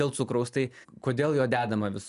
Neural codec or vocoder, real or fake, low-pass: none; real; 10.8 kHz